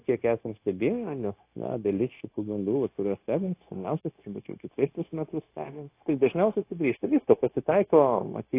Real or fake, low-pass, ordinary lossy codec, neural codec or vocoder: fake; 3.6 kHz; AAC, 24 kbps; codec, 16 kHz, 0.9 kbps, LongCat-Audio-Codec